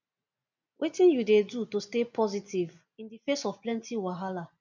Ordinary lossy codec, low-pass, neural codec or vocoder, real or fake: none; 7.2 kHz; none; real